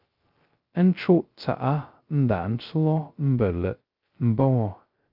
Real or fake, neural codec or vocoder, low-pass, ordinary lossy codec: fake; codec, 16 kHz, 0.2 kbps, FocalCodec; 5.4 kHz; Opus, 24 kbps